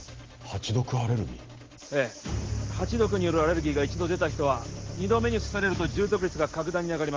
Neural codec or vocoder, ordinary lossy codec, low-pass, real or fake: none; Opus, 16 kbps; 7.2 kHz; real